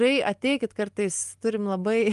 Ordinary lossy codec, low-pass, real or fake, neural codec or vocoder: Opus, 32 kbps; 10.8 kHz; real; none